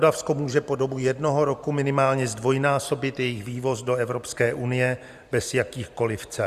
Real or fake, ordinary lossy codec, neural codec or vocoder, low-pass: fake; Opus, 64 kbps; vocoder, 44.1 kHz, 128 mel bands every 512 samples, BigVGAN v2; 14.4 kHz